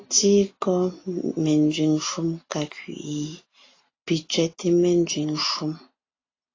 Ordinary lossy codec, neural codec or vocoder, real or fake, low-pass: AAC, 32 kbps; none; real; 7.2 kHz